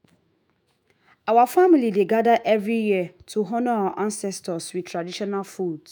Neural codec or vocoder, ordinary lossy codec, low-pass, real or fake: autoencoder, 48 kHz, 128 numbers a frame, DAC-VAE, trained on Japanese speech; none; none; fake